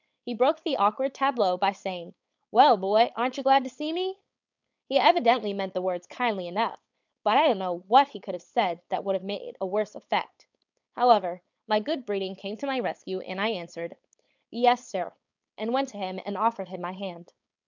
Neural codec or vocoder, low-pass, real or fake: codec, 16 kHz, 4.8 kbps, FACodec; 7.2 kHz; fake